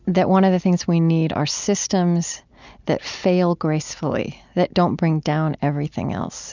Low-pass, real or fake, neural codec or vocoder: 7.2 kHz; real; none